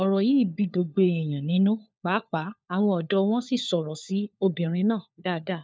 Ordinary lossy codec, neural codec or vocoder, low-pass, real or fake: none; codec, 16 kHz, 4 kbps, FunCodec, trained on LibriTTS, 50 frames a second; none; fake